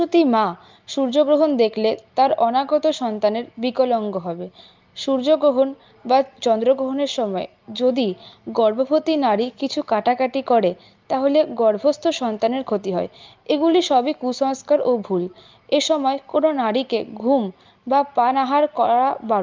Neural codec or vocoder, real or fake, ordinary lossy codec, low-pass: none; real; Opus, 24 kbps; 7.2 kHz